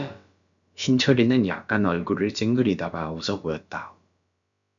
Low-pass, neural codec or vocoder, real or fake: 7.2 kHz; codec, 16 kHz, about 1 kbps, DyCAST, with the encoder's durations; fake